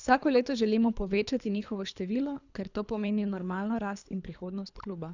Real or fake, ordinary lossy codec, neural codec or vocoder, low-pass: fake; none; codec, 24 kHz, 3 kbps, HILCodec; 7.2 kHz